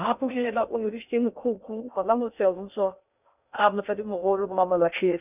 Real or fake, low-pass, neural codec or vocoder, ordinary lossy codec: fake; 3.6 kHz; codec, 16 kHz in and 24 kHz out, 0.6 kbps, FocalCodec, streaming, 2048 codes; none